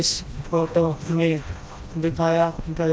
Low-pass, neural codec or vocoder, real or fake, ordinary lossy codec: none; codec, 16 kHz, 1 kbps, FreqCodec, smaller model; fake; none